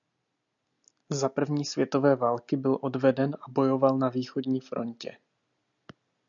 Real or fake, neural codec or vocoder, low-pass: real; none; 7.2 kHz